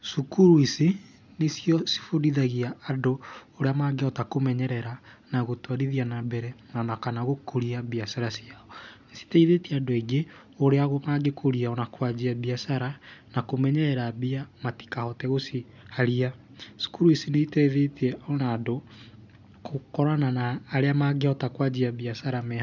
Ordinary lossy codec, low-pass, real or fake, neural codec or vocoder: none; 7.2 kHz; real; none